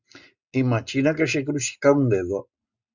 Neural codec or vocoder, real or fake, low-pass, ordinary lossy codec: none; real; 7.2 kHz; Opus, 64 kbps